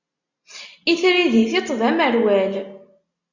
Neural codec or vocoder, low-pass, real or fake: none; 7.2 kHz; real